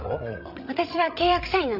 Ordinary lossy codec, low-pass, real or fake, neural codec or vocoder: none; 5.4 kHz; fake; codec, 16 kHz, 16 kbps, FreqCodec, larger model